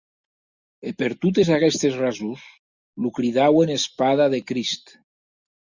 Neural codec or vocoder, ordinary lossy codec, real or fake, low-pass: none; Opus, 64 kbps; real; 7.2 kHz